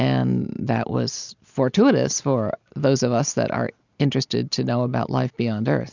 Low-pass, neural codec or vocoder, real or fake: 7.2 kHz; none; real